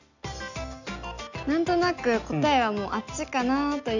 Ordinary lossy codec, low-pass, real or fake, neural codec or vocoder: none; 7.2 kHz; real; none